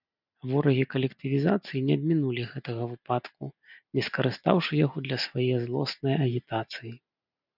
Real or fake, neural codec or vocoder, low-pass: real; none; 5.4 kHz